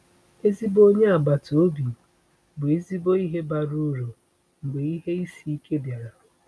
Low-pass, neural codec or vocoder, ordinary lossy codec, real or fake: none; none; none; real